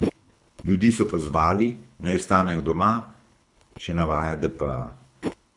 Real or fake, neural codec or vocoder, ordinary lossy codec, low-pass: fake; codec, 24 kHz, 3 kbps, HILCodec; none; 10.8 kHz